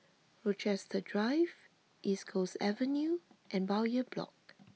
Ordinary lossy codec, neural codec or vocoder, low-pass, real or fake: none; none; none; real